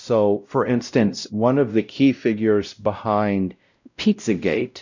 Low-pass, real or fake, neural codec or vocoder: 7.2 kHz; fake; codec, 16 kHz, 0.5 kbps, X-Codec, WavLM features, trained on Multilingual LibriSpeech